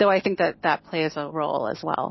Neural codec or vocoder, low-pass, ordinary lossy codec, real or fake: none; 7.2 kHz; MP3, 24 kbps; real